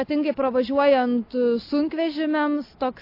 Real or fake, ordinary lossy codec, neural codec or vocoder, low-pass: real; AAC, 32 kbps; none; 5.4 kHz